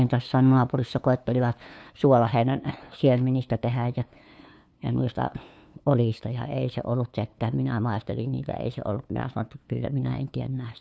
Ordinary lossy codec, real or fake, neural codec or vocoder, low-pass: none; fake; codec, 16 kHz, 2 kbps, FunCodec, trained on LibriTTS, 25 frames a second; none